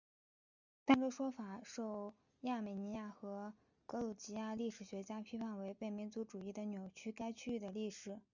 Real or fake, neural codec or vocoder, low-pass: real; none; 7.2 kHz